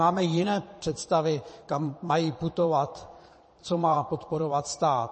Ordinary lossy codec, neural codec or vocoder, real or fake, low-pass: MP3, 32 kbps; vocoder, 22.05 kHz, 80 mel bands, Vocos; fake; 9.9 kHz